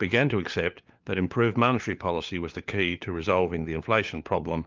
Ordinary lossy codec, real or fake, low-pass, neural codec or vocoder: Opus, 32 kbps; fake; 7.2 kHz; codec, 16 kHz, 4 kbps, FunCodec, trained on LibriTTS, 50 frames a second